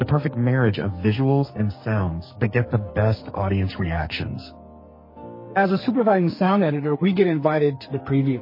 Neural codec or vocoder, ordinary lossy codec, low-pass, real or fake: codec, 44.1 kHz, 2.6 kbps, SNAC; MP3, 24 kbps; 5.4 kHz; fake